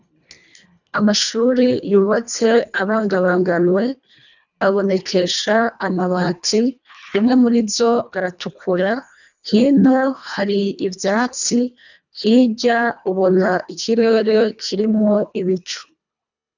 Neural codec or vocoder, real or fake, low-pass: codec, 24 kHz, 1.5 kbps, HILCodec; fake; 7.2 kHz